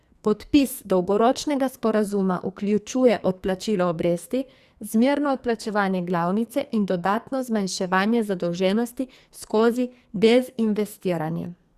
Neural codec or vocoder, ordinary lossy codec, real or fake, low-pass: codec, 44.1 kHz, 2.6 kbps, SNAC; Opus, 64 kbps; fake; 14.4 kHz